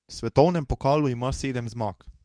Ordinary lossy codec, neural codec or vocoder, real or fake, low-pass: none; codec, 24 kHz, 0.9 kbps, WavTokenizer, medium speech release version 2; fake; 9.9 kHz